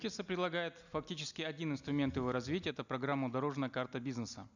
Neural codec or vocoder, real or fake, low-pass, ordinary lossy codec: none; real; 7.2 kHz; none